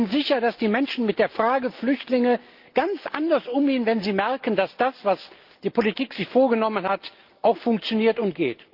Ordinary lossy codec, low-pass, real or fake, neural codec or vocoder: Opus, 32 kbps; 5.4 kHz; real; none